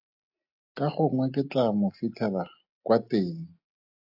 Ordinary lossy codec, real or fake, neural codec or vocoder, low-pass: AAC, 48 kbps; real; none; 5.4 kHz